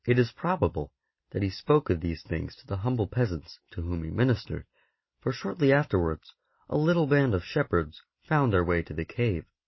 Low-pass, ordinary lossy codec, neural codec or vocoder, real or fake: 7.2 kHz; MP3, 24 kbps; none; real